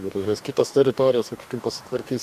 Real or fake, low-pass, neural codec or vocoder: fake; 14.4 kHz; codec, 44.1 kHz, 2.6 kbps, DAC